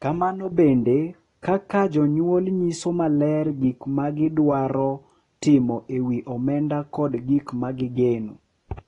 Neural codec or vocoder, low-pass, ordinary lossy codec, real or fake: none; 10.8 kHz; AAC, 32 kbps; real